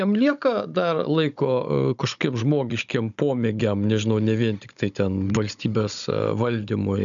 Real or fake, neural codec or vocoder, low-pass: fake; codec, 16 kHz, 16 kbps, FunCodec, trained on Chinese and English, 50 frames a second; 7.2 kHz